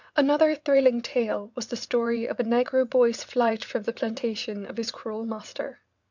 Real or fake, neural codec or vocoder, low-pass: fake; vocoder, 22.05 kHz, 80 mel bands, WaveNeXt; 7.2 kHz